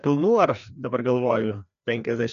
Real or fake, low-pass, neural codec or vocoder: fake; 7.2 kHz; codec, 16 kHz, 2 kbps, FreqCodec, larger model